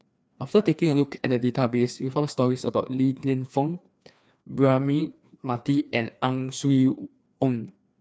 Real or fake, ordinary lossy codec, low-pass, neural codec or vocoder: fake; none; none; codec, 16 kHz, 2 kbps, FreqCodec, larger model